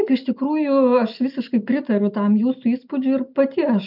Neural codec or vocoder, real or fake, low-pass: none; real; 5.4 kHz